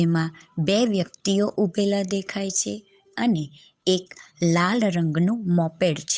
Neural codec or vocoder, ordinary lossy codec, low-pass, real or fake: codec, 16 kHz, 8 kbps, FunCodec, trained on Chinese and English, 25 frames a second; none; none; fake